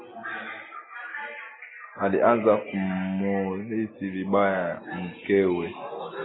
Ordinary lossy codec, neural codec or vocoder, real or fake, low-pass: AAC, 16 kbps; none; real; 7.2 kHz